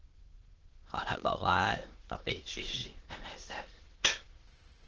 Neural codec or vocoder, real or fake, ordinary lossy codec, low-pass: autoencoder, 22.05 kHz, a latent of 192 numbers a frame, VITS, trained on many speakers; fake; Opus, 16 kbps; 7.2 kHz